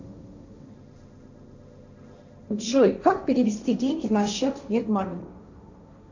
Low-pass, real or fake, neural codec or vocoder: 7.2 kHz; fake; codec, 16 kHz, 1.1 kbps, Voila-Tokenizer